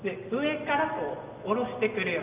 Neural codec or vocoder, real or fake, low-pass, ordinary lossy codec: none; real; 3.6 kHz; Opus, 24 kbps